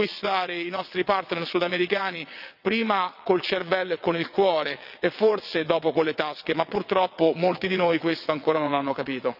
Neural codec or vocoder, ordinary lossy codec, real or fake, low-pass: vocoder, 22.05 kHz, 80 mel bands, WaveNeXt; none; fake; 5.4 kHz